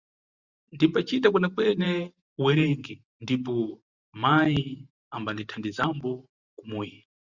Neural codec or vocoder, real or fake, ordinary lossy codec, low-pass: vocoder, 44.1 kHz, 128 mel bands every 512 samples, BigVGAN v2; fake; Opus, 64 kbps; 7.2 kHz